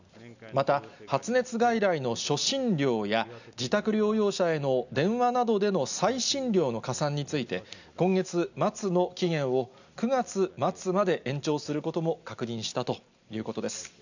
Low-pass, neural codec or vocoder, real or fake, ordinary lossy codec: 7.2 kHz; none; real; none